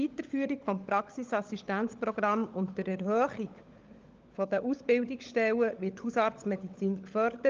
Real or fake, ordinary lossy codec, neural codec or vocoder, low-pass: fake; Opus, 24 kbps; codec, 16 kHz, 16 kbps, FunCodec, trained on LibriTTS, 50 frames a second; 7.2 kHz